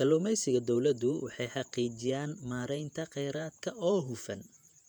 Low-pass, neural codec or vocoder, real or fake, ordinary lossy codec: 19.8 kHz; vocoder, 48 kHz, 128 mel bands, Vocos; fake; none